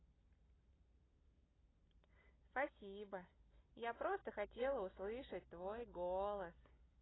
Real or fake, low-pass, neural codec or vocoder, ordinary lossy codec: real; 7.2 kHz; none; AAC, 16 kbps